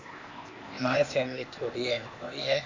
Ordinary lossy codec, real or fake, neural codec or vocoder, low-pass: AAC, 48 kbps; fake; codec, 16 kHz, 0.8 kbps, ZipCodec; 7.2 kHz